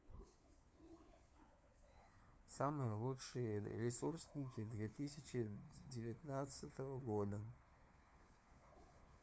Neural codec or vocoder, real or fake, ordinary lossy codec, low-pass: codec, 16 kHz, 4 kbps, FunCodec, trained on LibriTTS, 50 frames a second; fake; none; none